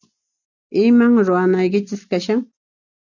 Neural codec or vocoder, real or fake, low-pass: none; real; 7.2 kHz